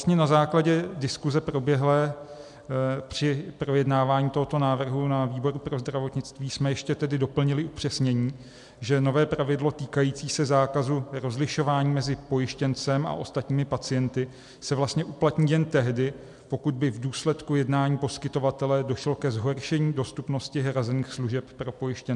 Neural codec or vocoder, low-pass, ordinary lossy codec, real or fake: none; 10.8 kHz; MP3, 96 kbps; real